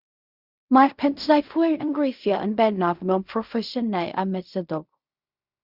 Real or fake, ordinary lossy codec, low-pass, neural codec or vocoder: fake; Opus, 64 kbps; 5.4 kHz; codec, 16 kHz in and 24 kHz out, 0.4 kbps, LongCat-Audio-Codec, fine tuned four codebook decoder